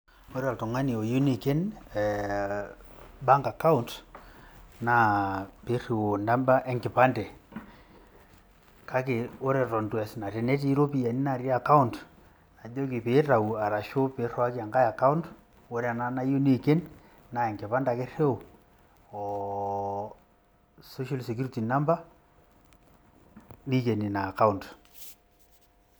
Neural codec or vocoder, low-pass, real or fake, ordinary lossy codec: none; none; real; none